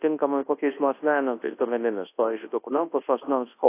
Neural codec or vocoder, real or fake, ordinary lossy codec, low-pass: codec, 24 kHz, 0.9 kbps, WavTokenizer, large speech release; fake; AAC, 24 kbps; 3.6 kHz